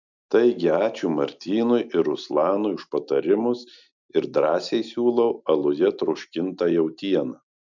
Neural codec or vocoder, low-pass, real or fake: none; 7.2 kHz; real